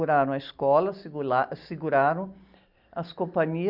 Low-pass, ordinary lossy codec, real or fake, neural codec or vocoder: 5.4 kHz; Opus, 64 kbps; real; none